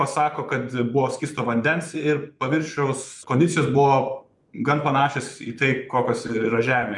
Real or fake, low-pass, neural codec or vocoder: fake; 10.8 kHz; vocoder, 24 kHz, 100 mel bands, Vocos